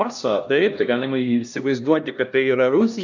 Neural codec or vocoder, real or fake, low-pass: codec, 16 kHz, 1 kbps, X-Codec, HuBERT features, trained on LibriSpeech; fake; 7.2 kHz